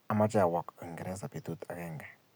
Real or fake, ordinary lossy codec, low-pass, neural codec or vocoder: real; none; none; none